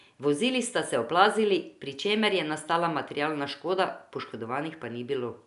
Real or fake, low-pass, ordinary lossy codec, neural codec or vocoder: real; 10.8 kHz; none; none